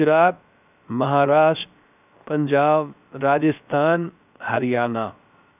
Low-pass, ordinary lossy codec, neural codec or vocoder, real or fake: 3.6 kHz; none; codec, 16 kHz, about 1 kbps, DyCAST, with the encoder's durations; fake